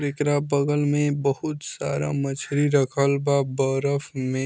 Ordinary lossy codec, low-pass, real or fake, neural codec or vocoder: none; none; real; none